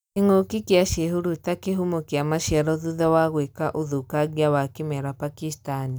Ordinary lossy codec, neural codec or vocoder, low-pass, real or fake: none; none; none; real